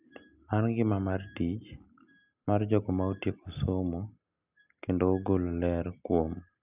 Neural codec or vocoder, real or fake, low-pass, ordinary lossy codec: none; real; 3.6 kHz; none